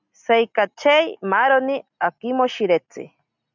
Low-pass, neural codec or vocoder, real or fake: 7.2 kHz; none; real